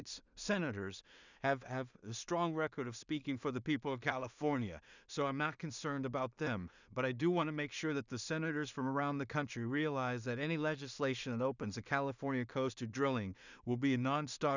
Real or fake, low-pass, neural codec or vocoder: fake; 7.2 kHz; codec, 16 kHz in and 24 kHz out, 0.4 kbps, LongCat-Audio-Codec, two codebook decoder